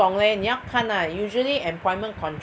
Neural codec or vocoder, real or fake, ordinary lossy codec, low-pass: none; real; none; none